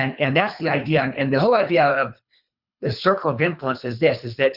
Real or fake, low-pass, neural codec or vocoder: fake; 5.4 kHz; codec, 24 kHz, 3 kbps, HILCodec